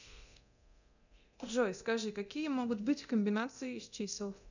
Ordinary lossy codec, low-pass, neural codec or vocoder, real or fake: none; 7.2 kHz; codec, 24 kHz, 0.9 kbps, DualCodec; fake